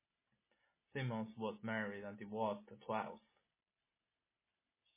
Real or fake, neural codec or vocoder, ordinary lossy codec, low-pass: real; none; MP3, 16 kbps; 3.6 kHz